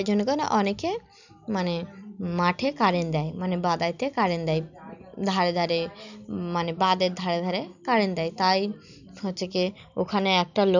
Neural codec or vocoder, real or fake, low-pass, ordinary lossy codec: none; real; 7.2 kHz; none